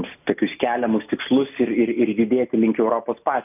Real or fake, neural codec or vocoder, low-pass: real; none; 3.6 kHz